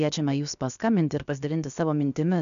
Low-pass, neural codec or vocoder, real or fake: 7.2 kHz; codec, 16 kHz, about 1 kbps, DyCAST, with the encoder's durations; fake